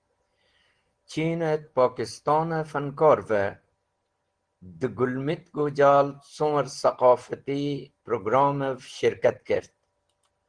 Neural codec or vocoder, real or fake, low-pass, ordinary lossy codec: none; real; 9.9 kHz; Opus, 16 kbps